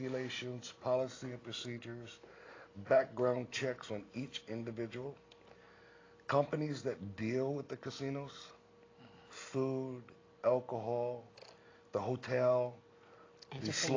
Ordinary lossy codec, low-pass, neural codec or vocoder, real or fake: AAC, 32 kbps; 7.2 kHz; none; real